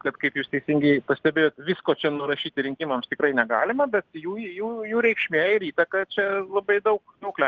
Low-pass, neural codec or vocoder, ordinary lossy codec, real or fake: 7.2 kHz; none; Opus, 16 kbps; real